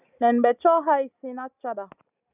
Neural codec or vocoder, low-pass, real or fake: none; 3.6 kHz; real